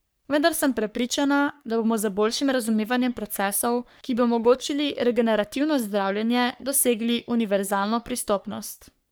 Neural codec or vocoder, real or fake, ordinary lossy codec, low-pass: codec, 44.1 kHz, 3.4 kbps, Pupu-Codec; fake; none; none